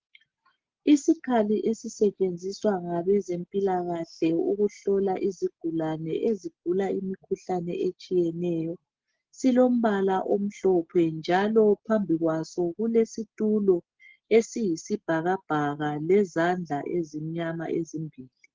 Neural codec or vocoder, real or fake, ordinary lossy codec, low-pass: none; real; Opus, 16 kbps; 7.2 kHz